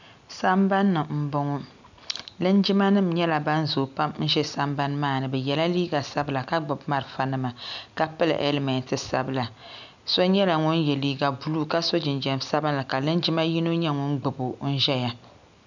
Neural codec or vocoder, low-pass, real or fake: none; 7.2 kHz; real